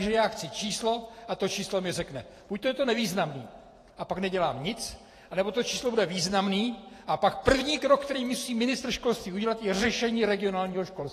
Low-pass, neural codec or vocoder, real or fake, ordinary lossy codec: 14.4 kHz; vocoder, 48 kHz, 128 mel bands, Vocos; fake; AAC, 48 kbps